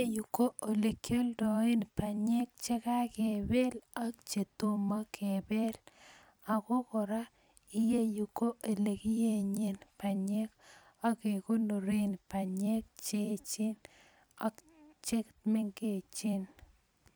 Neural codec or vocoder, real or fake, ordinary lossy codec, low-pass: vocoder, 44.1 kHz, 128 mel bands every 256 samples, BigVGAN v2; fake; none; none